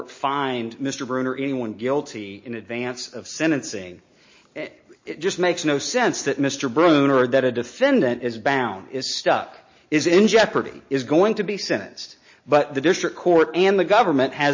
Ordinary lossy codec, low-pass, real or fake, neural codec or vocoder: MP3, 32 kbps; 7.2 kHz; real; none